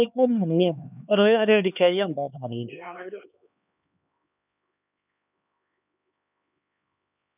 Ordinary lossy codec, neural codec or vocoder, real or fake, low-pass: none; codec, 16 kHz, 2 kbps, X-Codec, HuBERT features, trained on LibriSpeech; fake; 3.6 kHz